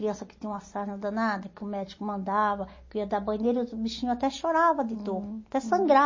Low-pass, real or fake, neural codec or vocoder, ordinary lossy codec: 7.2 kHz; real; none; MP3, 32 kbps